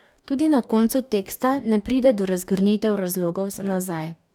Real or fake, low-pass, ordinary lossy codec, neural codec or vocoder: fake; 19.8 kHz; none; codec, 44.1 kHz, 2.6 kbps, DAC